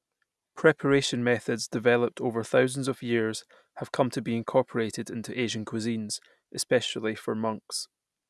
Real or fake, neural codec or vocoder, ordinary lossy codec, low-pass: real; none; none; none